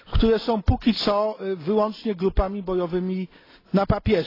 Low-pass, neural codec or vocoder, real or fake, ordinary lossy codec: 5.4 kHz; none; real; AAC, 24 kbps